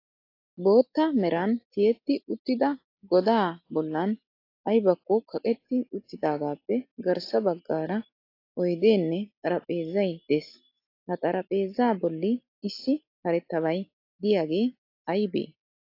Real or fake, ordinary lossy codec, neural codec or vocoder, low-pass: real; AAC, 32 kbps; none; 5.4 kHz